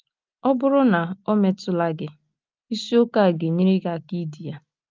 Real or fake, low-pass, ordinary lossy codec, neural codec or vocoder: real; 7.2 kHz; Opus, 24 kbps; none